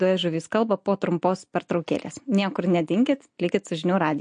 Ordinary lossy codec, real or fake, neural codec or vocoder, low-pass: MP3, 48 kbps; real; none; 9.9 kHz